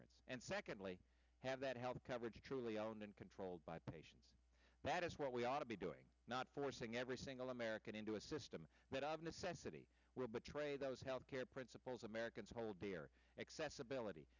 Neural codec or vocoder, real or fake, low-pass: none; real; 7.2 kHz